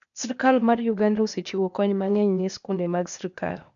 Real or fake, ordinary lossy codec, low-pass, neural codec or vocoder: fake; none; 7.2 kHz; codec, 16 kHz, 0.8 kbps, ZipCodec